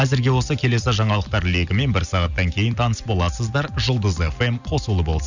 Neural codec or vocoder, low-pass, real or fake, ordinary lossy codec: none; 7.2 kHz; real; none